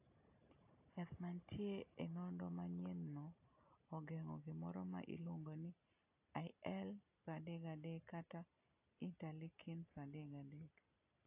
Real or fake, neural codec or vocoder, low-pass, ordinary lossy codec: real; none; 3.6 kHz; AAC, 32 kbps